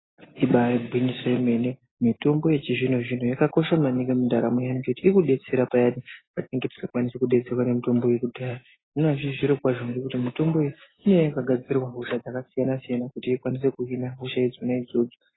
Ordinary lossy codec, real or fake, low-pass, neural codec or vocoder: AAC, 16 kbps; real; 7.2 kHz; none